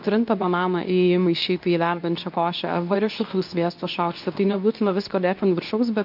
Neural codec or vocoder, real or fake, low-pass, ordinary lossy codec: codec, 24 kHz, 0.9 kbps, WavTokenizer, medium speech release version 2; fake; 5.4 kHz; MP3, 48 kbps